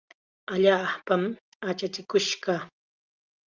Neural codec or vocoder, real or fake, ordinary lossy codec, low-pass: none; real; Opus, 32 kbps; 7.2 kHz